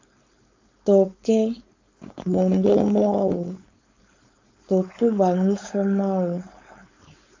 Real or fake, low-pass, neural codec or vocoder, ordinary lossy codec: fake; 7.2 kHz; codec, 16 kHz, 4.8 kbps, FACodec; AAC, 48 kbps